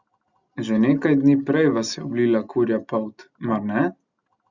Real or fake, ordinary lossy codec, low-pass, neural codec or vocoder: real; Opus, 64 kbps; 7.2 kHz; none